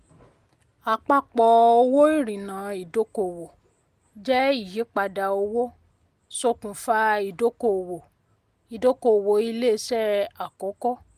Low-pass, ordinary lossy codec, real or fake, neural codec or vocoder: 14.4 kHz; Opus, 32 kbps; real; none